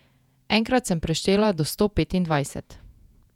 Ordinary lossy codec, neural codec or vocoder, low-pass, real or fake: none; vocoder, 48 kHz, 128 mel bands, Vocos; 19.8 kHz; fake